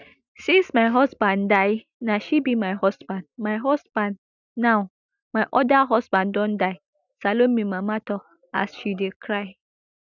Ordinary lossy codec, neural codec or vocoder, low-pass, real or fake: none; none; 7.2 kHz; real